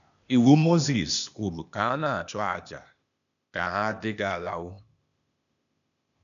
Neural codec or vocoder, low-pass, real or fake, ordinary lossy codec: codec, 16 kHz, 0.8 kbps, ZipCodec; 7.2 kHz; fake; none